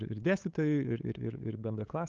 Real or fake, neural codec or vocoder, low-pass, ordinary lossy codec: fake; codec, 16 kHz, 8 kbps, FunCodec, trained on LibriTTS, 25 frames a second; 7.2 kHz; Opus, 32 kbps